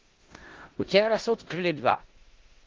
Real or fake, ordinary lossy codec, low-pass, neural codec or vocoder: fake; Opus, 16 kbps; 7.2 kHz; codec, 16 kHz in and 24 kHz out, 0.4 kbps, LongCat-Audio-Codec, four codebook decoder